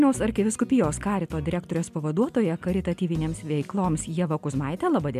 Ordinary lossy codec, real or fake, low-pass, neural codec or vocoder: MP3, 96 kbps; real; 14.4 kHz; none